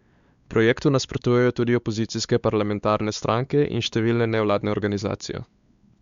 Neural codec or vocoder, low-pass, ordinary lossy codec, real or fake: codec, 16 kHz, 4 kbps, X-Codec, WavLM features, trained on Multilingual LibriSpeech; 7.2 kHz; Opus, 64 kbps; fake